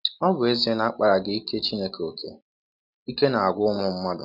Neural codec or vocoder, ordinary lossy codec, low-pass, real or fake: none; none; 5.4 kHz; real